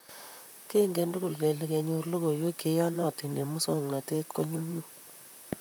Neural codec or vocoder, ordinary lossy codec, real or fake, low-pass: vocoder, 44.1 kHz, 128 mel bands, Pupu-Vocoder; none; fake; none